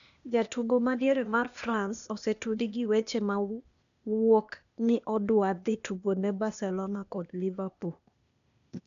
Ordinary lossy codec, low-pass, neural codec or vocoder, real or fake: none; 7.2 kHz; codec, 16 kHz, 0.8 kbps, ZipCodec; fake